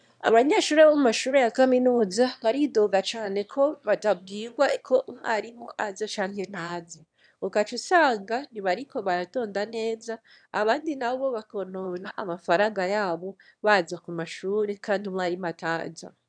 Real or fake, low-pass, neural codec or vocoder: fake; 9.9 kHz; autoencoder, 22.05 kHz, a latent of 192 numbers a frame, VITS, trained on one speaker